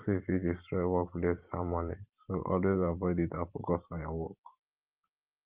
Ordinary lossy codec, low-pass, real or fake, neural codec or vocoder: Opus, 32 kbps; 3.6 kHz; fake; vocoder, 44.1 kHz, 128 mel bands every 512 samples, BigVGAN v2